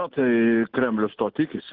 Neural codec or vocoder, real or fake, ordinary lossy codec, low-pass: none; real; Opus, 16 kbps; 5.4 kHz